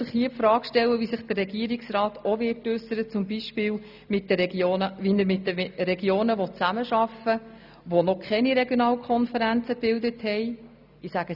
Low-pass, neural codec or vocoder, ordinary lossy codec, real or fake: 5.4 kHz; none; none; real